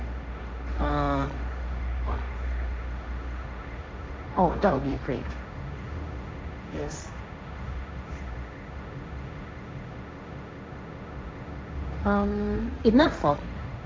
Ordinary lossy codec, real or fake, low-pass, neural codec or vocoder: none; fake; none; codec, 16 kHz, 1.1 kbps, Voila-Tokenizer